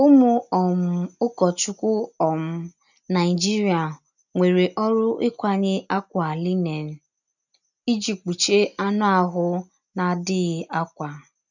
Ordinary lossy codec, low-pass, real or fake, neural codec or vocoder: none; 7.2 kHz; real; none